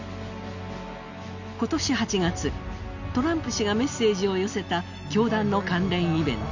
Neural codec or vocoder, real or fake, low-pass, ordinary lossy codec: none; real; 7.2 kHz; none